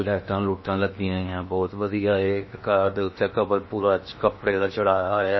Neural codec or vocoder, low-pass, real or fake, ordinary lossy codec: codec, 16 kHz in and 24 kHz out, 0.8 kbps, FocalCodec, streaming, 65536 codes; 7.2 kHz; fake; MP3, 24 kbps